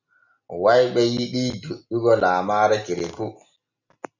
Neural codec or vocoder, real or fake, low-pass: none; real; 7.2 kHz